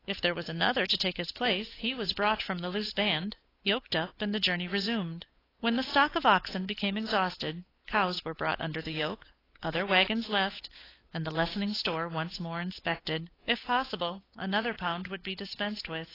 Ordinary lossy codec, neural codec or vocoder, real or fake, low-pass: AAC, 24 kbps; codec, 16 kHz, 8 kbps, FunCodec, trained on Chinese and English, 25 frames a second; fake; 5.4 kHz